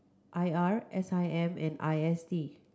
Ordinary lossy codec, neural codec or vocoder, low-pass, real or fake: none; none; none; real